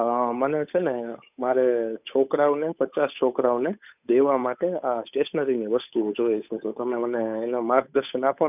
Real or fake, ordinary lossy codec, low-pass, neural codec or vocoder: fake; none; 3.6 kHz; codec, 16 kHz, 8 kbps, FunCodec, trained on Chinese and English, 25 frames a second